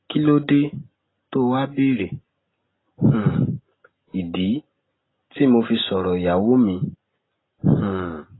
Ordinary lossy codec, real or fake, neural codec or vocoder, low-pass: AAC, 16 kbps; real; none; 7.2 kHz